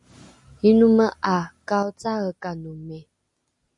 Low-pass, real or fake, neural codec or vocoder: 10.8 kHz; real; none